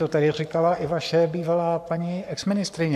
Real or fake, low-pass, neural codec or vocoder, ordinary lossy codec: fake; 14.4 kHz; codec, 44.1 kHz, 7.8 kbps, Pupu-Codec; MP3, 96 kbps